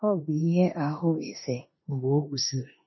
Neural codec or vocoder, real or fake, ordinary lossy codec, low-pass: codec, 16 kHz in and 24 kHz out, 0.9 kbps, LongCat-Audio-Codec, four codebook decoder; fake; MP3, 24 kbps; 7.2 kHz